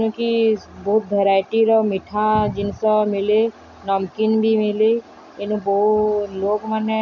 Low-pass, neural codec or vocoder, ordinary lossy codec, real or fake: 7.2 kHz; none; none; real